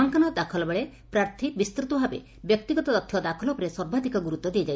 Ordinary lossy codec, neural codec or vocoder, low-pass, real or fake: none; none; none; real